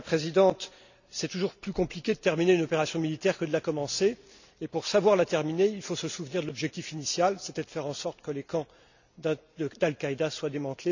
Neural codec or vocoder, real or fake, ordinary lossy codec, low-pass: none; real; none; 7.2 kHz